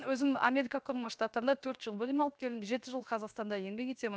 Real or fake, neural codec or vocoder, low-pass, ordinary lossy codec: fake; codec, 16 kHz, 0.7 kbps, FocalCodec; none; none